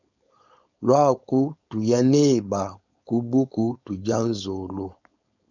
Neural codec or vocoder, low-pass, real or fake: codec, 16 kHz, 4.8 kbps, FACodec; 7.2 kHz; fake